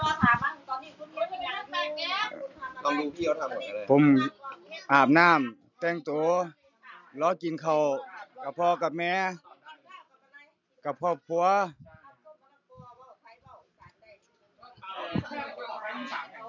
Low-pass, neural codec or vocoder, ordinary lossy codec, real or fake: 7.2 kHz; none; none; real